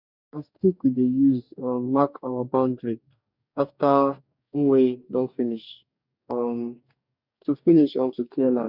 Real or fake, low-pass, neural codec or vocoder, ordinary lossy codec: fake; 5.4 kHz; codec, 44.1 kHz, 2.6 kbps, DAC; none